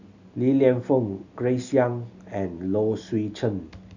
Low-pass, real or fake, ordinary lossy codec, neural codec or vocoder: 7.2 kHz; real; none; none